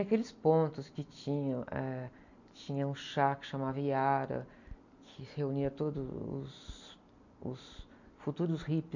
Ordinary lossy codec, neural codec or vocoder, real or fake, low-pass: none; none; real; 7.2 kHz